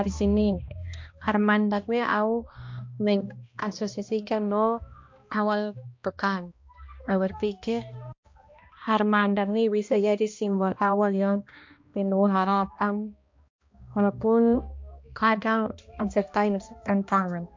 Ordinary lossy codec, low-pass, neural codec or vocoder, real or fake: MP3, 48 kbps; 7.2 kHz; codec, 16 kHz, 1 kbps, X-Codec, HuBERT features, trained on balanced general audio; fake